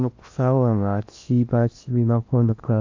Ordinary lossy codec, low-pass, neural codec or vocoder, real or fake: AAC, 48 kbps; 7.2 kHz; codec, 16 kHz in and 24 kHz out, 0.8 kbps, FocalCodec, streaming, 65536 codes; fake